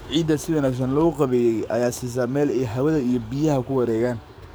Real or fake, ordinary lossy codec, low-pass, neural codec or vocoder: fake; none; none; codec, 44.1 kHz, 7.8 kbps, DAC